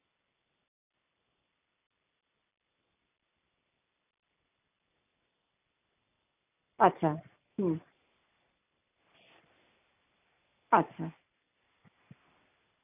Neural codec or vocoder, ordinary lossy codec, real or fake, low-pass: none; none; real; 3.6 kHz